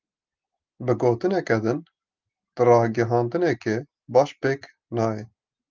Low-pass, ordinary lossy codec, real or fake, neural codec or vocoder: 7.2 kHz; Opus, 32 kbps; real; none